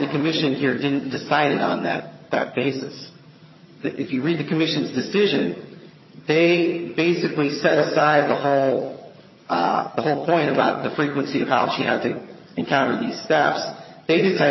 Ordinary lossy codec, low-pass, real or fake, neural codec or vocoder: MP3, 24 kbps; 7.2 kHz; fake; vocoder, 22.05 kHz, 80 mel bands, HiFi-GAN